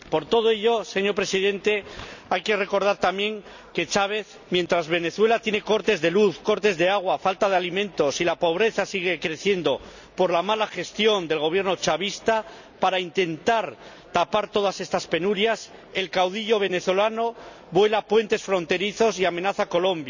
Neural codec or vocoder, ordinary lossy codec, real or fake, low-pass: none; none; real; 7.2 kHz